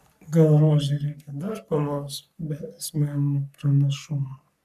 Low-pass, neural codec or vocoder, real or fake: 14.4 kHz; codec, 44.1 kHz, 3.4 kbps, Pupu-Codec; fake